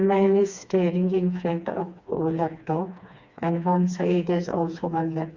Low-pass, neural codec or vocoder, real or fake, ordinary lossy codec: 7.2 kHz; codec, 16 kHz, 2 kbps, FreqCodec, smaller model; fake; Opus, 64 kbps